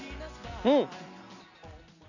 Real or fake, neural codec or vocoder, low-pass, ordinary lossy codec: real; none; 7.2 kHz; none